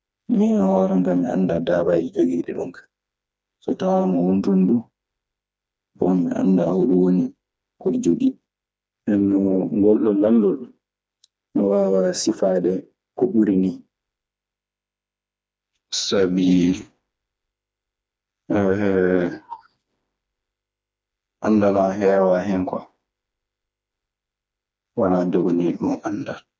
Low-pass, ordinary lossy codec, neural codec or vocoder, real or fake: none; none; codec, 16 kHz, 2 kbps, FreqCodec, smaller model; fake